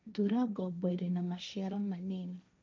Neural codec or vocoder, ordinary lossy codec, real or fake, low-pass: codec, 16 kHz, 1.1 kbps, Voila-Tokenizer; none; fake; none